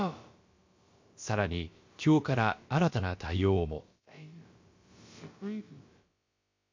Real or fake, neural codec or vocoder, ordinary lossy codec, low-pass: fake; codec, 16 kHz, about 1 kbps, DyCAST, with the encoder's durations; MP3, 48 kbps; 7.2 kHz